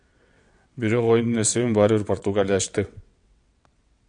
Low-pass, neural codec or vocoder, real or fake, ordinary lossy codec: 9.9 kHz; vocoder, 22.05 kHz, 80 mel bands, WaveNeXt; fake; MP3, 64 kbps